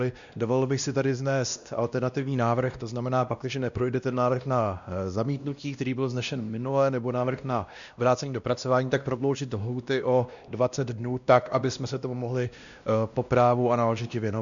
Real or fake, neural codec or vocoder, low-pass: fake; codec, 16 kHz, 1 kbps, X-Codec, WavLM features, trained on Multilingual LibriSpeech; 7.2 kHz